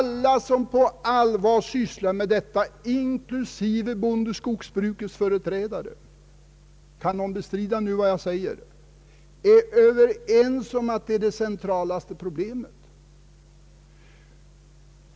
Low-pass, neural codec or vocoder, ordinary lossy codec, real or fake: none; none; none; real